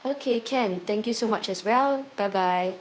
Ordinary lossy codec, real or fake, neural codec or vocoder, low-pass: none; fake; codec, 16 kHz, 2 kbps, FunCodec, trained on Chinese and English, 25 frames a second; none